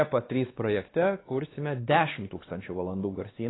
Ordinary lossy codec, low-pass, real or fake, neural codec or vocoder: AAC, 16 kbps; 7.2 kHz; fake; codec, 16 kHz, 2 kbps, X-Codec, WavLM features, trained on Multilingual LibriSpeech